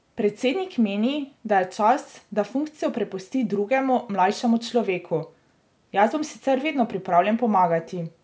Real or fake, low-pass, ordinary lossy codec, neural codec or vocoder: real; none; none; none